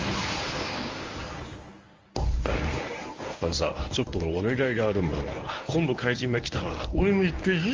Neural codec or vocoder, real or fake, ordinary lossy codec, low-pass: codec, 24 kHz, 0.9 kbps, WavTokenizer, medium speech release version 1; fake; Opus, 32 kbps; 7.2 kHz